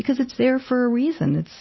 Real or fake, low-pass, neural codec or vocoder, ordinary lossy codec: real; 7.2 kHz; none; MP3, 24 kbps